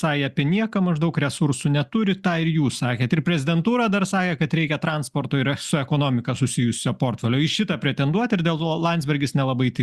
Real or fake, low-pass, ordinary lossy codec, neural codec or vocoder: real; 14.4 kHz; Opus, 64 kbps; none